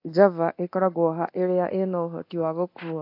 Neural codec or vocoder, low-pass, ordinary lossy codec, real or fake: codec, 24 kHz, 0.9 kbps, DualCodec; 5.4 kHz; AAC, 32 kbps; fake